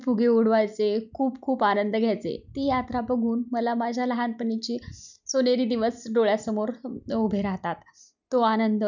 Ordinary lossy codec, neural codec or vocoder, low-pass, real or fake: none; none; 7.2 kHz; real